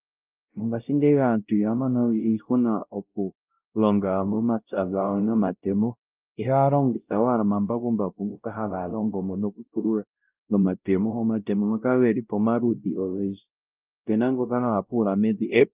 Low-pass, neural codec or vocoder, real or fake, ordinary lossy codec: 3.6 kHz; codec, 16 kHz, 0.5 kbps, X-Codec, WavLM features, trained on Multilingual LibriSpeech; fake; Opus, 32 kbps